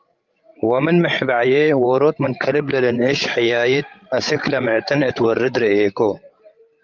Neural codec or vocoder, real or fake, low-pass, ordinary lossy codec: codec, 16 kHz, 16 kbps, FreqCodec, larger model; fake; 7.2 kHz; Opus, 24 kbps